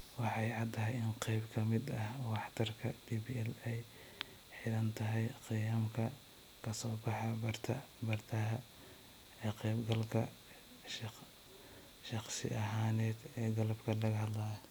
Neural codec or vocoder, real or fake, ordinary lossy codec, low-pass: none; real; none; none